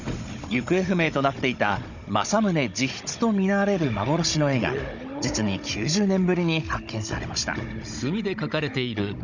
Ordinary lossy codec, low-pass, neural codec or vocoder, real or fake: none; 7.2 kHz; codec, 16 kHz, 16 kbps, FunCodec, trained on Chinese and English, 50 frames a second; fake